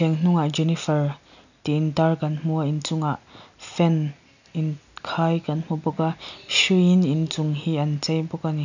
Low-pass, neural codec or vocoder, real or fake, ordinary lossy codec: 7.2 kHz; none; real; none